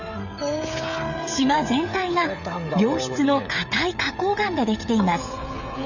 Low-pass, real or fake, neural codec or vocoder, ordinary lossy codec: 7.2 kHz; fake; codec, 16 kHz, 16 kbps, FreqCodec, smaller model; none